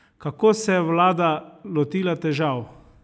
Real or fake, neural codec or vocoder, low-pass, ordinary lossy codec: real; none; none; none